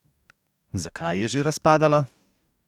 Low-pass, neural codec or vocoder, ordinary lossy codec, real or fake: 19.8 kHz; codec, 44.1 kHz, 2.6 kbps, DAC; none; fake